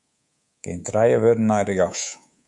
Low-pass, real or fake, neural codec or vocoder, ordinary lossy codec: 10.8 kHz; fake; codec, 24 kHz, 3.1 kbps, DualCodec; MP3, 64 kbps